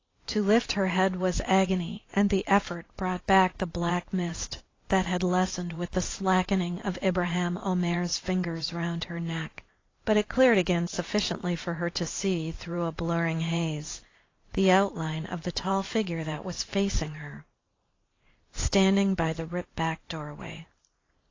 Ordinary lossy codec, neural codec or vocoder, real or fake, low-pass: AAC, 32 kbps; vocoder, 44.1 kHz, 128 mel bands every 512 samples, BigVGAN v2; fake; 7.2 kHz